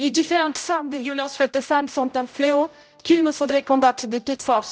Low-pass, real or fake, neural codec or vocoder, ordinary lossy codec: none; fake; codec, 16 kHz, 0.5 kbps, X-Codec, HuBERT features, trained on general audio; none